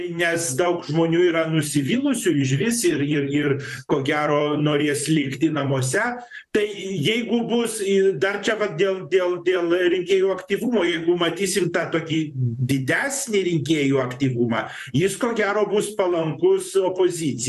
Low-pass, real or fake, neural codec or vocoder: 14.4 kHz; fake; vocoder, 44.1 kHz, 128 mel bands, Pupu-Vocoder